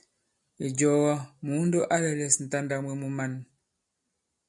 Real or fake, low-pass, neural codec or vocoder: real; 10.8 kHz; none